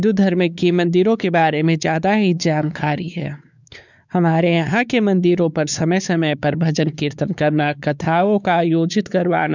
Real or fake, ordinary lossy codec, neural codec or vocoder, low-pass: fake; none; codec, 16 kHz, 4 kbps, X-Codec, WavLM features, trained on Multilingual LibriSpeech; 7.2 kHz